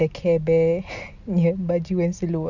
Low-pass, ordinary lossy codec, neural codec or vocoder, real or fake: 7.2 kHz; none; none; real